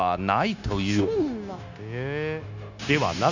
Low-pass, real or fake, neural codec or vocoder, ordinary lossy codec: 7.2 kHz; fake; codec, 16 kHz, 0.9 kbps, LongCat-Audio-Codec; none